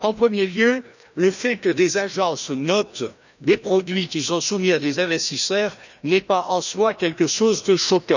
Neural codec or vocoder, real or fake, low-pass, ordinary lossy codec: codec, 16 kHz, 1 kbps, FreqCodec, larger model; fake; 7.2 kHz; none